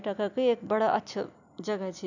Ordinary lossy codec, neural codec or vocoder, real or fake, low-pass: none; none; real; 7.2 kHz